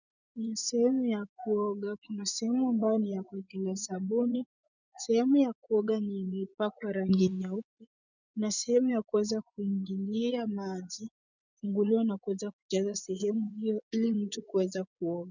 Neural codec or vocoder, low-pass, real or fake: vocoder, 24 kHz, 100 mel bands, Vocos; 7.2 kHz; fake